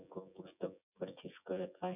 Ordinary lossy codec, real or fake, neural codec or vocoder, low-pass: none; fake; vocoder, 24 kHz, 100 mel bands, Vocos; 3.6 kHz